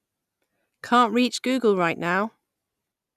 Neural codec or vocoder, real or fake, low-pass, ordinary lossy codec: none; real; 14.4 kHz; none